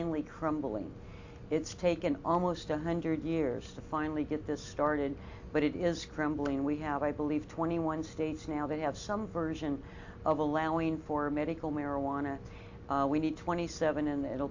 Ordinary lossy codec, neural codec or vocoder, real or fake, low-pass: AAC, 48 kbps; none; real; 7.2 kHz